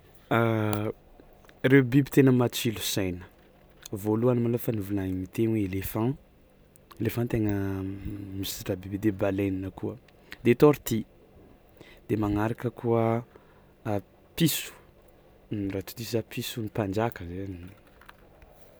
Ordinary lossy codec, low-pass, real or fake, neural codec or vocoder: none; none; real; none